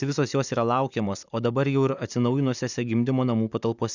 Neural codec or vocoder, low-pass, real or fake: vocoder, 44.1 kHz, 128 mel bands, Pupu-Vocoder; 7.2 kHz; fake